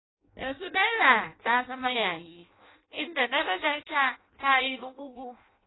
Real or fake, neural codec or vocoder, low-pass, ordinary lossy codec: fake; codec, 16 kHz in and 24 kHz out, 0.6 kbps, FireRedTTS-2 codec; 7.2 kHz; AAC, 16 kbps